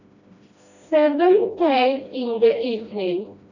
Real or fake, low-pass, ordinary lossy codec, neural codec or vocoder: fake; 7.2 kHz; none; codec, 16 kHz, 1 kbps, FreqCodec, smaller model